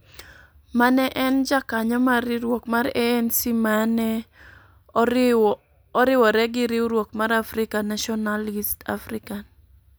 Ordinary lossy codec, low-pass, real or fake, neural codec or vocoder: none; none; real; none